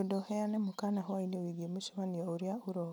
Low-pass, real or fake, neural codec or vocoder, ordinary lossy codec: none; real; none; none